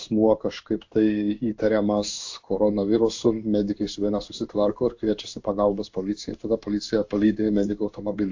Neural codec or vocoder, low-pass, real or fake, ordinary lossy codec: codec, 16 kHz in and 24 kHz out, 1 kbps, XY-Tokenizer; 7.2 kHz; fake; AAC, 48 kbps